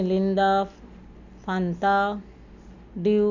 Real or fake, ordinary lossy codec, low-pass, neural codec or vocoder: real; none; 7.2 kHz; none